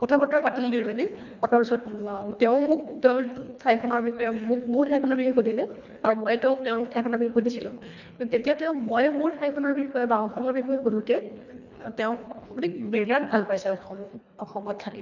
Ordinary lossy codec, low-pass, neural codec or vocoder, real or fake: none; 7.2 kHz; codec, 24 kHz, 1.5 kbps, HILCodec; fake